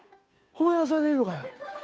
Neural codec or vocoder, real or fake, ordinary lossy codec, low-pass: codec, 16 kHz, 2 kbps, FunCodec, trained on Chinese and English, 25 frames a second; fake; none; none